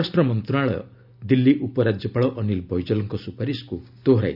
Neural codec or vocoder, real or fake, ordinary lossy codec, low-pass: none; real; none; 5.4 kHz